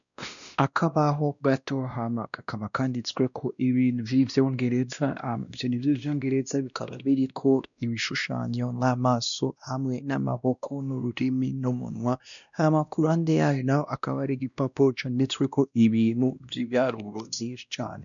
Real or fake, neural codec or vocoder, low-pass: fake; codec, 16 kHz, 1 kbps, X-Codec, WavLM features, trained on Multilingual LibriSpeech; 7.2 kHz